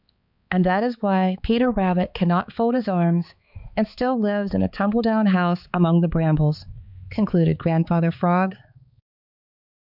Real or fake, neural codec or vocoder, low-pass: fake; codec, 16 kHz, 4 kbps, X-Codec, HuBERT features, trained on balanced general audio; 5.4 kHz